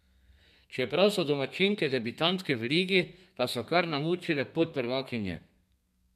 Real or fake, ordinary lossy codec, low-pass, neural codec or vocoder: fake; none; 14.4 kHz; codec, 32 kHz, 1.9 kbps, SNAC